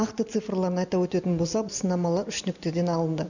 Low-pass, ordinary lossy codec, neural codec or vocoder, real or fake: 7.2 kHz; none; none; real